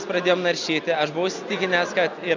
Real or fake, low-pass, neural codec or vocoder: real; 7.2 kHz; none